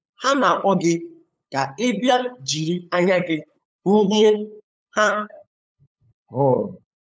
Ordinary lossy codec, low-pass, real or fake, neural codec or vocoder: none; none; fake; codec, 16 kHz, 8 kbps, FunCodec, trained on LibriTTS, 25 frames a second